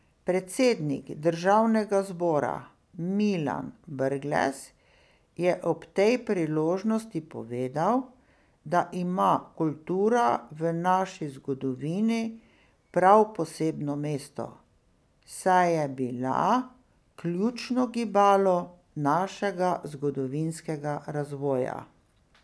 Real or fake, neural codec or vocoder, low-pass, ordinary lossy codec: real; none; none; none